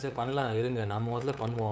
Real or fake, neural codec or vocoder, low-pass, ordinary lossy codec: fake; codec, 16 kHz, 8 kbps, FunCodec, trained on LibriTTS, 25 frames a second; none; none